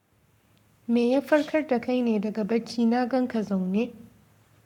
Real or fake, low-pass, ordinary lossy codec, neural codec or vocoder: fake; 19.8 kHz; none; codec, 44.1 kHz, 7.8 kbps, Pupu-Codec